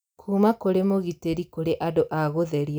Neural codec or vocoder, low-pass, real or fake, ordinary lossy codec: none; none; real; none